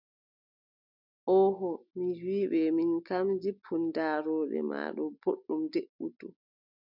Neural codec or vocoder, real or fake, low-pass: none; real; 5.4 kHz